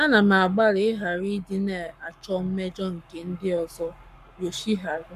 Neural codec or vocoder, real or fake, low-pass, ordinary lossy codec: codec, 44.1 kHz, 7.8 kbps, Pupu-Codec; fake; 14.4 kHz; MP3, 96 kbps